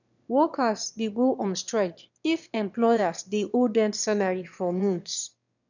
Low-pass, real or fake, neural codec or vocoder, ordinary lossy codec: 7.2 kHz; fake; autoencoder, 22.05 kHz, a latent of 192 numbers a frame, VITS, trained on one speaker; none